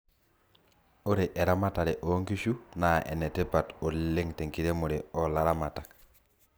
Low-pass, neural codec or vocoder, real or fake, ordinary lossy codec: none; none; real; none